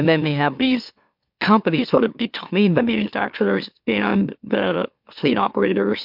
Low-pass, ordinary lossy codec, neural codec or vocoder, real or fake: 5.4 kHz; MP3, 48 kbps; autoencoder, 44.1 kHz, a latent of 192 numbers a frame, MeloTTS; fake